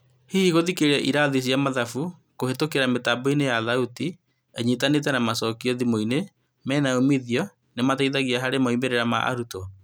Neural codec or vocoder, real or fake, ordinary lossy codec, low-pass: none; real; none; none